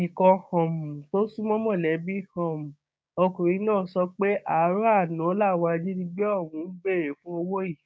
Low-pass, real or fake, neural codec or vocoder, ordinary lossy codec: none; fake; codec, 16 kHz, 6 kbps, DAC; none